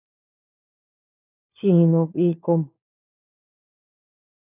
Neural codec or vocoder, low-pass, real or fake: codec, 16 kHz, 4 kbps, FunCodec, trained on LibriTTS, 50 frames a second; 3.6 kHz; fake